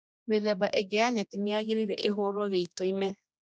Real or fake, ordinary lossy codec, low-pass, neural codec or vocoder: fake; none; none; codec, 16 kHz, 1 kbps, X-Codec, HuBERT features, trained on general audio